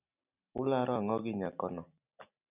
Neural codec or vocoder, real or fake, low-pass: none; real; 3.6 kHz